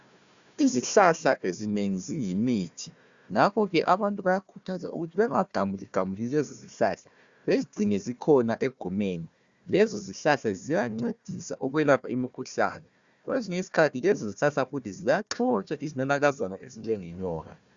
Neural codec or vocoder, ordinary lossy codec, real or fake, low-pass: codec, 16 kHz, 1 kbps, FunCodec, trained on Chinese and English, 50 frames a second; Opus, 64 kbps; fake; 7.2 kHz